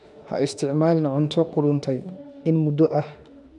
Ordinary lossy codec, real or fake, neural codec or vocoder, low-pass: none; fake; autoencoder, 48 kHz, 32 numbers a frame, DAC-VAE, trained on Japanese speech; 10.8 kHz